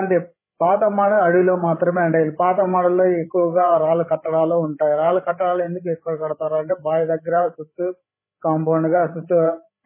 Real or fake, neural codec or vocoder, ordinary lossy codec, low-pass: fake; codec, 16 kHz, 8 kbps, FreqCodec, larger model; MP3, 16 kbps; 3.6 kHz